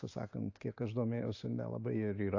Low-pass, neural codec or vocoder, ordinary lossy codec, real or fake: 7.2 kHz; none; AAC, 48 kbps; real